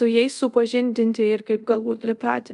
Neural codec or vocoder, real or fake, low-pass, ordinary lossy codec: codec, 24 kHz, 0.5 kbps, DualCodec; fake; 10.8 kHz; MP3, 96 kbps